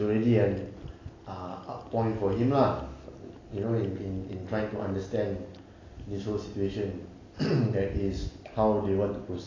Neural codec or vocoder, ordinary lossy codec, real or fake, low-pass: none; AAC, 32 kbps; real; 7.2 kHz